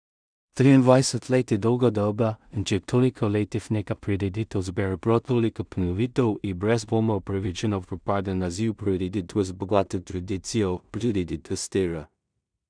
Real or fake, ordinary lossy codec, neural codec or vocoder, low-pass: fake; none; codec, 16 kHz in and 24 kHz out, 0.4 kbps, LongCat-Audio-Codec, two codebook decoder; 9.9 kHz